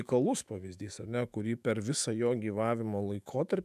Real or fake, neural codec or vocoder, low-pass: fake; autoencoder, 48 kHz, 128 numbers a frame, DAC-VAE, trained on Japanese speech; 14.4 kHz